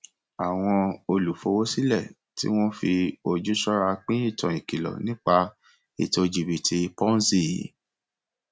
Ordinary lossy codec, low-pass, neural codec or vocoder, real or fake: none; none; none; real